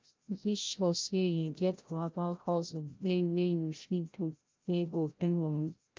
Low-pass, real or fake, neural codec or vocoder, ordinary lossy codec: 7.2 kHz; fake; codec, 16 kHz, 0.5 kbps, FreqCodec, larger model; Opus, 24 kbps